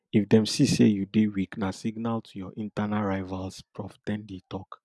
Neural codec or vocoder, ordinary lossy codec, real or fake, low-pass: none; none; real; none